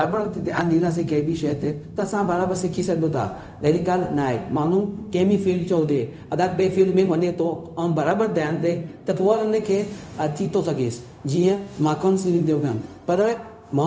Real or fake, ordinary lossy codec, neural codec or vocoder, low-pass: fake; none; codec, 16 kHz, 0.4 kbps, LongCat-Audio-Codec; none